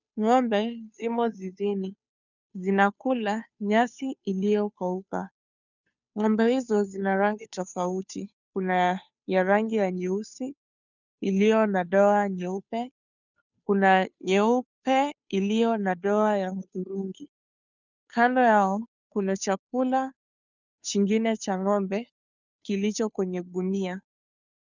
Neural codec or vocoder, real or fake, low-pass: codec, 16 kHz, 2 kbps, FunCodec, trained on Chinese and English, 25 frames a second; fake; 7.2 kHz